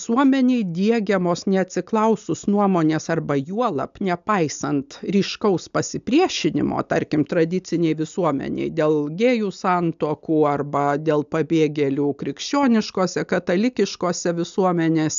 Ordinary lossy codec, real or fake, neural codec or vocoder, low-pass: AAC, 96 kbps; real; none; 7.2 kHz